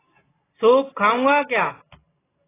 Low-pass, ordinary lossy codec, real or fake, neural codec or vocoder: 3.6 kHz; AAC, 16 kbps; real; none